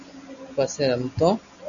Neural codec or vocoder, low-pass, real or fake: none; 7.2 kHz; real